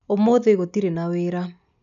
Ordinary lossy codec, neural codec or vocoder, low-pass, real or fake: none; none; 7.2 kHz; real